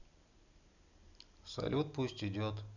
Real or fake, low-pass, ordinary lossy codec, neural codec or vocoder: real; 7.2 kHz; none; none